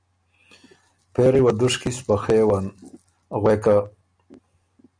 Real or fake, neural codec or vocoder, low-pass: real; none; 9.9 kHz